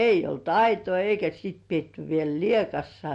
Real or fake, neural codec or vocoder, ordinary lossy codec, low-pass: real; none; MP3, 48 kbps; 14.4 kHz